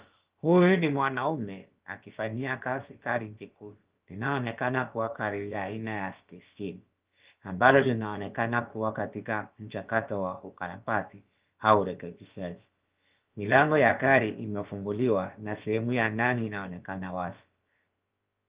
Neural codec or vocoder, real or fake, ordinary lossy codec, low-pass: codec, 16 kHz, about 1 kbps, DyCAST, with the encoder's durations; fake; Opus, 32 kbps; 3.6 kHz